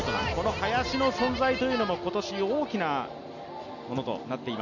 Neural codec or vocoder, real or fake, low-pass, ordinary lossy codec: none; real; 7.2 kHz; none